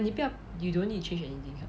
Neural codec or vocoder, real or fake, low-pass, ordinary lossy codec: none; real; none; none